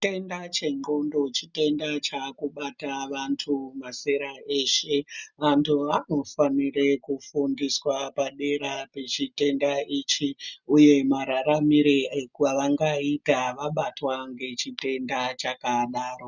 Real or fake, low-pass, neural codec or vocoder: real; 7.2 kHz; none